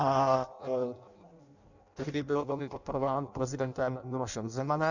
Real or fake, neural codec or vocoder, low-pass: fake; codec, 16 kHz in and 24 kHz out, 0.6 kbps, FireRedTTS-2 codec; 7.2 kHz